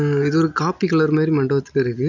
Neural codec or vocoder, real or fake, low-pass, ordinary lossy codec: none; real; 7.2 kHz; none